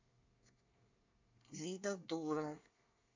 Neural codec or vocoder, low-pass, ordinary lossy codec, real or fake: codec, 24 kHz, 1 kbps, SNAC; 7.2 kHz; AAC, 48 kbps; fake